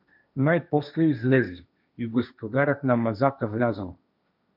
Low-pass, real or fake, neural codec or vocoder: 5.4 kHz; fake; codec, 16 kHz, 1.1 kbps, Voila-Tokenizer